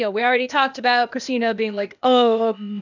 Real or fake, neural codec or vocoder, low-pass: fake; codec, 16 kHz, 0.8 kbps, ZipCodec; 7.2 kHz